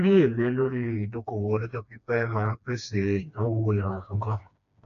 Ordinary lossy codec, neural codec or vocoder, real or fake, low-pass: none; codec, 16 kHz, 2 kbps, FreqCodec, smaller model; fake; 7.2 kHz